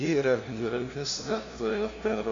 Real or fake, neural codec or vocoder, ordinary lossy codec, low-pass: fake; codec, 16 kHz, 0.5 kbps, FunCodec, trained on LibriTTS, 25 frames a second; AAC, 48 kbps; 7.2 kHz